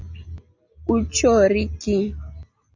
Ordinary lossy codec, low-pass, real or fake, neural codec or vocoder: Opus, 64 kbps; 7.2 kHz; real; none